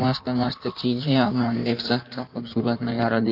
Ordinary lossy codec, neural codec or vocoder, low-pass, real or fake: none; codec, 16 kHz in and 24 kHz out, 1.1 kbps, FireRedTTS-2 codec; 5.4 kHz; fake